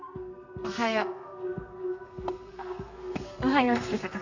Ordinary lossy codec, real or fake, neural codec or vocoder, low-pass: none; fake; codec, 32 kHz, 1.9 kbps, SNAC; 7.2 kHz